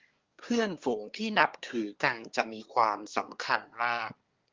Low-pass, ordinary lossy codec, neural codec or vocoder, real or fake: 7.2 kHz; Opus, 32 kbps; codec, 24 kHz, 1 kbps, SNAC; fake